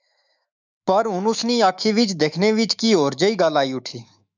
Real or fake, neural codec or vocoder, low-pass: fake; autoencoder, 48 kHz, 128 numbers a frame, DAC-VAE, trained on Japanese speech; 7.2 kHz